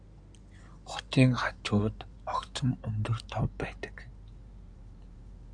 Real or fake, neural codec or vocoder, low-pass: fake; codec, 16 kHz in and 24 kHz out, 2.2 kbps, FireRedTTS-2 codec; 9.9 kHz